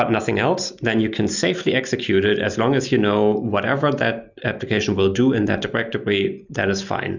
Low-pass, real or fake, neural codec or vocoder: 7.2 kHz; real; none